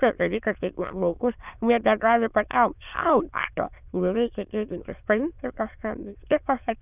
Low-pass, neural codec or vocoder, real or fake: 3.6 kHz; autoencoder, 22.05 kHz, a latent of 192 numbers a frame, VITS, trained on many speakers; fake